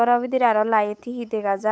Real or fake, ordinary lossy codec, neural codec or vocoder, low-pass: fake; none; codec, 16 kHz, 8 kbps, FunCodec, trained on LibriTTS, 25 frames a second; none